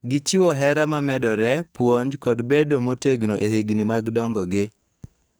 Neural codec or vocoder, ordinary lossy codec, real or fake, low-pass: codec, 44.1 kHz, 2.6 kbps, SNAC; none; fake; none